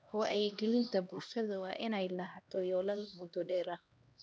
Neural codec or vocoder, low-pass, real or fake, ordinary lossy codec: codec, 16 kHz, 1 kbps, X-Codec, HuBERT features, trained on LibriSpeech; none; fake; none